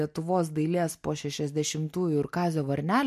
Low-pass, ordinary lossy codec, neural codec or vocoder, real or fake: 14.4 kHz; MP3, 64 kbps; none; real